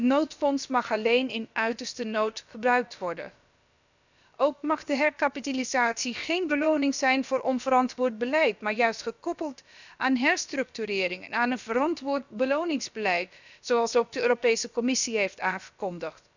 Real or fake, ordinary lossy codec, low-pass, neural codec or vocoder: fake; none; 7.2 kHz; codec, 16 kHz, about 1 kbps, DyCAST, with the encoder's durations